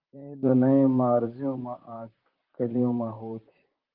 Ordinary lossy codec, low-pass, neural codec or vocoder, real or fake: Opus, 24 kbps; 5.4 kHz; vocoder, 44.1 kHz, 128 mel bands, Pupu-Vocoder; fake